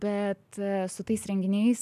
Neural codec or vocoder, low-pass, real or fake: none; 14.4 kHz; real